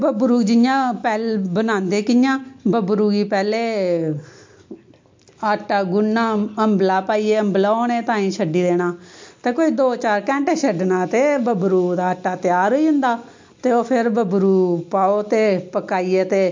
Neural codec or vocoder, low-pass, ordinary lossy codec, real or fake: none; 7.2 kHz; MP3, 48 kbps; real